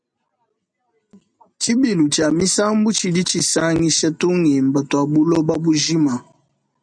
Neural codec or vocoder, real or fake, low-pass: none; real; 9.9 kHz